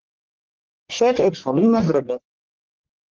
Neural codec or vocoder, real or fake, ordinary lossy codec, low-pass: codec, 44.1 kHz, 1.7 kbps, Pupu-Codec; fake; Opus, 16 kbps; 7.2 kHz